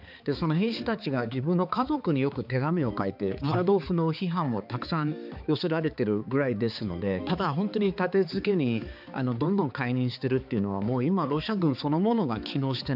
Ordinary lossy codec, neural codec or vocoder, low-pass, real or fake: none; codec, 16 kHz, 4 kbps, X-Codec, HuBERT features, trained on balanced general audio; 5.4 kHz; fake